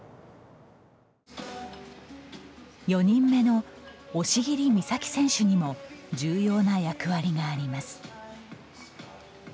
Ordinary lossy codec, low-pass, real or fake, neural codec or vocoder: none; none; real; none